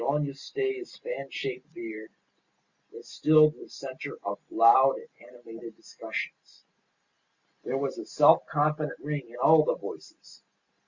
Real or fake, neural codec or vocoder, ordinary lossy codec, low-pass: real; none; Opus, 64 kbps; 7.2 kHz